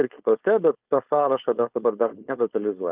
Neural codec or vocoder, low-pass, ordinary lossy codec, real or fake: codec, 16 kHz, 4.8 kbps, FACodec; 3.6 kHz; Opus, 24 kbps; fake